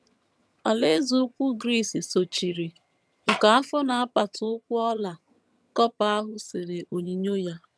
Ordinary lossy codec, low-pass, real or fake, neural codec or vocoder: none; none; fake; vocoder, 22.05 kHz, 80 mel bands, HiFi-GAN